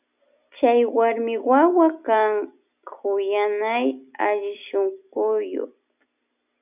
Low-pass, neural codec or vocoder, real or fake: 3.6 kHz; none; real